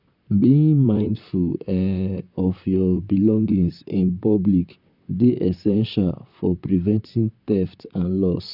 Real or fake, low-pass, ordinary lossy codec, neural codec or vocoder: fake; 5.4 kHz; none; vocoder, 44.1 kHz, 128 mel bands, Pupu-Vocoder